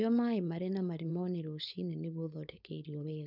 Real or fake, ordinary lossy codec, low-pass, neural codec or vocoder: fake; none; 5.4 kHz; codec, 16 kHz, 4.8 kbps, FACodec